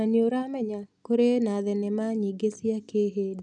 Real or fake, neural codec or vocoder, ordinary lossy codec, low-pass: real; none; none; 9.9 kHz